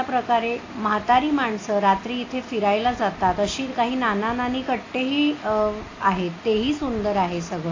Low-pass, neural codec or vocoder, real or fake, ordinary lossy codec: 7.2 kHz; none; real; AAC, 32 kbps